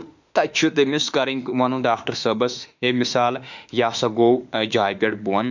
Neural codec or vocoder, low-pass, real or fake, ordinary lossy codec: autoencoder, 48 kHz, 32 numbers a frame, DAC-VAE, trained on Japanese speech; 7.2 kHz; fake; none